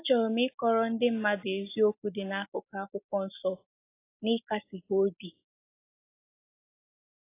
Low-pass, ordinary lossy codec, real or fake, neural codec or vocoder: 3.6 kHz; AAC, 24 kbps; real; none